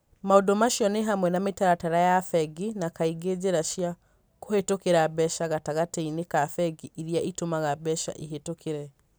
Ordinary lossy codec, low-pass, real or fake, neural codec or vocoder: none; none; real; none